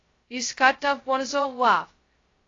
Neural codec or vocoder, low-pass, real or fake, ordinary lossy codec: codec, 16 kHz, 0.2 kbps, FocalCodec; 7.2 kHz; fake; AAC, 32 kbps